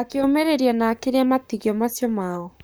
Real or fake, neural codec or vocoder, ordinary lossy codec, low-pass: fake; codec, 44.1 kHz, 7.8 kbps, Pupu-Codec; none; none